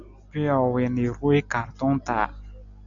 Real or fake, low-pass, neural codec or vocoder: real; 7.2 kHz; none